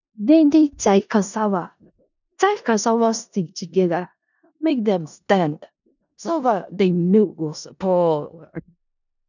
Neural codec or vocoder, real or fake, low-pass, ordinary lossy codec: codec, 16 kHz in and 24 kHz out, 0.4 kbps, LongCat-Audio-Codec, four codebook decoder; fake; 7.2 kHz; none